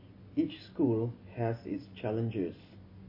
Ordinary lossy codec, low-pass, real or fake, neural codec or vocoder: MP3, 24 kbps; 5.4 kHz; real; none